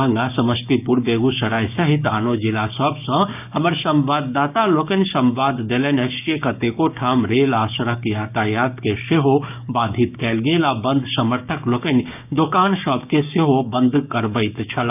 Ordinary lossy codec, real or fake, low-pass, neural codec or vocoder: none; fake; 3.6 kHz; codec, 16 kHz, 6 kbps, DAC